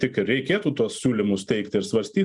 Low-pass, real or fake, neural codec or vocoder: 10.8 kHz; real; none